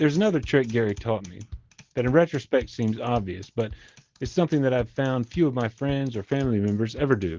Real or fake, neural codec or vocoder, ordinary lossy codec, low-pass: real; none; Opus, 16 kbps; 7.2 kHz